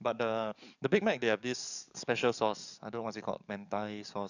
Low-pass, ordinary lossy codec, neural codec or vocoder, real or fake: 7.2 kHz; none; codec, 44.1 kHz, 7.8 kbps, DAC; fake